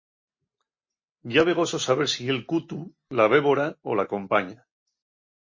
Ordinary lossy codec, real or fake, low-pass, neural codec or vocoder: MP3, 32 kbps; real; 7.2 kHz; none